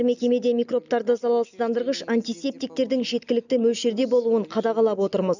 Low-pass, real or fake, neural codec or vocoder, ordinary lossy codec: 7.2 kHz; real; none; none